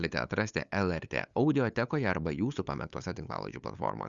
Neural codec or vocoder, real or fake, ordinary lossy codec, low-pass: codec, 16 kHz, 8 kbps, FunCodec, trained on LibriTTS, 25 frames a second; fake; Opus, 64 kbps; 7.2 kHz